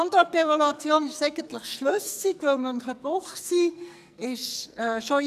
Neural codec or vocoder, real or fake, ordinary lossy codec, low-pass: codec, 44.1 kHz, 2.6 kbps, SNAC; fake; none; 14.4 kHz